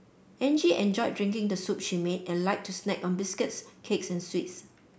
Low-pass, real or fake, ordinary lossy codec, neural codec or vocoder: none; real; none; none